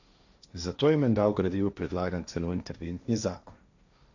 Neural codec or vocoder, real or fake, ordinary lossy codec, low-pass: codec, 16 kHz, 1.1 kbps, Voila-Tokenizer; fake; none; 7.2 kHz